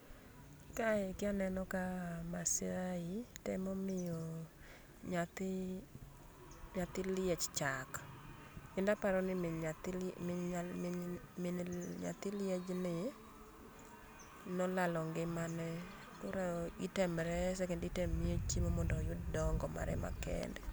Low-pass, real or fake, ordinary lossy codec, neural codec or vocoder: none; real; none; none